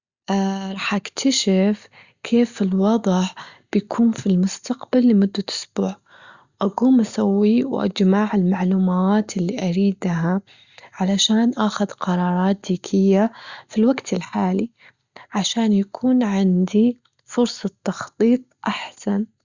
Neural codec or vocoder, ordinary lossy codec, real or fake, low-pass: none; Opus, 64 kbps; real; 7.2 kHz